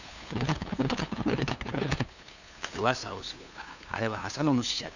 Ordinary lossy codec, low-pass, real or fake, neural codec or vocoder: none; 7.2 kHz; fake; codec, 16 kHz, 2 kbps, FunCodec, trained on LibriTTS, 25 frames a second